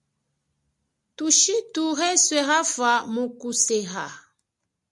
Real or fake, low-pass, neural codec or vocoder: real; 10.8 kHz; none